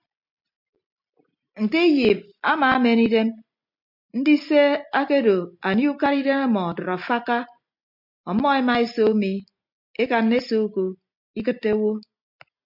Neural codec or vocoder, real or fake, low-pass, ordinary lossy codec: none; real; 5.4 kHz; MP3, 48 kbps